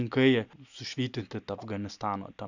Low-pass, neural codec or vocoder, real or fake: 7.2 kHz; none; real